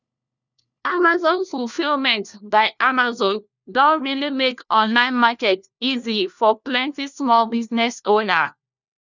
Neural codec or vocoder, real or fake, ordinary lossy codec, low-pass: codec, 16 kHz, 1 kbps, FunCodec, trained on LibriTTS, 50 frames a second; fake; none; 7.2 kHz